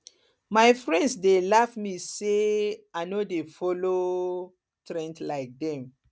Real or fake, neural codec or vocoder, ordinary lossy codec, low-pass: real; none; none; none